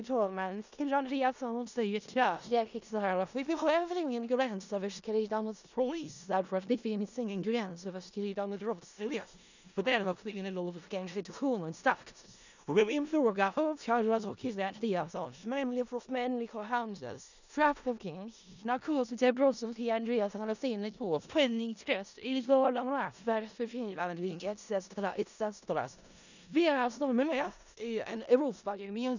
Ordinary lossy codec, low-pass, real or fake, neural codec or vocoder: none; 7.2 kHz; fake; codec, 16 kHz in and 24 kHz out, 0.4 kbps, LongCat-Audio-Codec, four codebook decoder